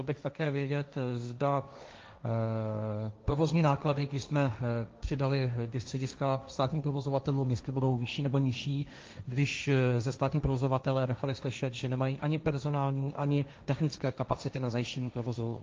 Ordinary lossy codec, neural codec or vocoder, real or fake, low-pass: Opus, 24 kbps; codec, 16 kHz, 1.1 kbps, Voila-Tokenizer; fake; 7.2 kHz